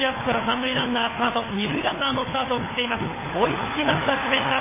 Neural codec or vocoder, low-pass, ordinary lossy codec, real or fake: codec, 16 kHz, 4 kbps, FunCodec, trained on LibriTTS, 50 frames a second; 3.6 kHz; MP3, 16 kbps; fake